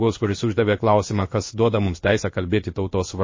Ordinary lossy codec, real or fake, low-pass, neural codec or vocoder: MP3, 32 kbps; fake; 7.2 kHz; codec, 16 kHz in and 24 kHz out, 1 kbps, XY-Tokenizer